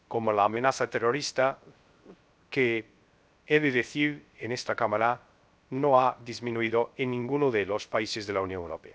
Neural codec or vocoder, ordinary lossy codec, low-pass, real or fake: codec, 16 kHz, 0.3 kbps, FocalCodec; none; none; fake